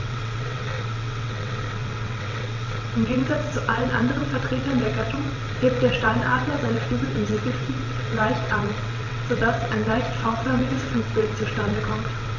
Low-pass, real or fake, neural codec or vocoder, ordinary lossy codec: 7.2 kHz; fake; vocoder, 22.05 kHz, 80 mel bands, WaveNeXt; none